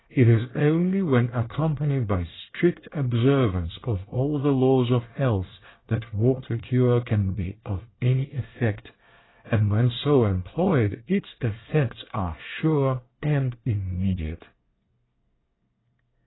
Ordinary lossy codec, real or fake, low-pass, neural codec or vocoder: AAC, 16 kbps; fake; 7.2 kHz; codec, 24 kHz, 1 kbps, SNAC